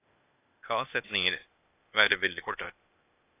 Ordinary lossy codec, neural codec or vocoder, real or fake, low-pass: AAC, 24 kbps; codec, 16 kHz, 0.8 kbps, ZipCodec; fake; 3.6 kHz